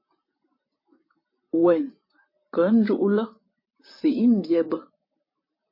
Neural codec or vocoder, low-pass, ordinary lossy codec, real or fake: vocoder, 44.1 kHz, 128 mel bands, Pupu-Vocoder; 5.4 kHz; MP3, 24 kbps; fake